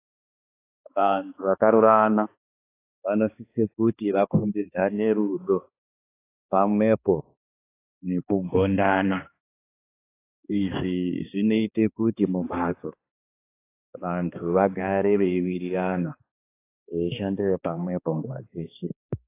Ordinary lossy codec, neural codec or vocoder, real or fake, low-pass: AAC, 24 kbps; codec, 16 kHz, 2 kbps, X-Codec, HuBERT features, trained on balanced general audio; fake; 3.6 kHz